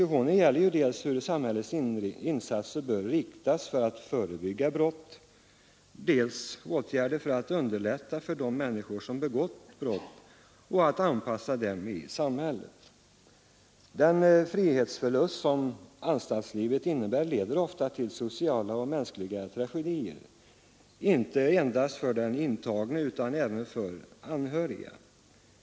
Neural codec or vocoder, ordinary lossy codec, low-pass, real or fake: none; none; none; real